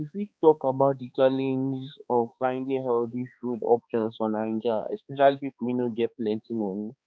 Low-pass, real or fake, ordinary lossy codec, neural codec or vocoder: none; fake; none; codec, 16 kHz, 2 kbps, X-Codec, HuBERT features, trained on balanced general audio